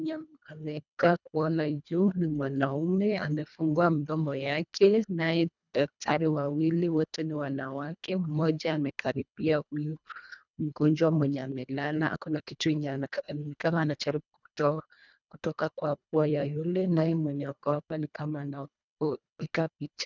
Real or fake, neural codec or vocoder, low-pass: fake; codec, 24 kHz, 1.5 kbps, HILCodec; 7.2 kHz